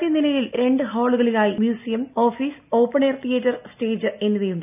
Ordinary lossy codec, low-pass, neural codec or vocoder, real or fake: none; 3.6 kHz; none; real